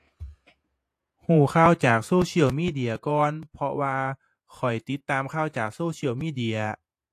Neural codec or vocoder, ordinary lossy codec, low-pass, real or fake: vocoder, 48 kHz, 128 mel bands, Vocos; AAC, 64 kbps; 14.4 kHz; fake